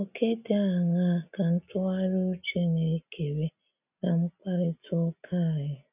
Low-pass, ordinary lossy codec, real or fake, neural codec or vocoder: 3.6 kHz; none; real; none